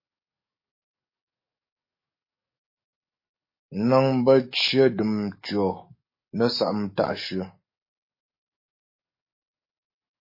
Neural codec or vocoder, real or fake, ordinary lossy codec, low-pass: codec, 44.1 kHz, 7.8 kbps, DAC; fake; MP3, 24 kbps; 5.4 kHz